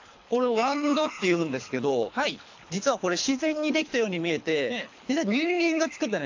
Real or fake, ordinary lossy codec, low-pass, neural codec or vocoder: fake; AAC, 48 kbps; 7.2 kHz; codec, 24 kHz, 3 kbps, HILCodec